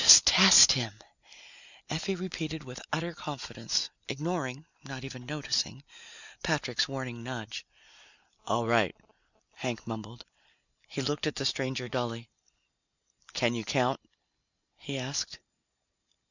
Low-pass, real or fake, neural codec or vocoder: 7.2 kHz; real; none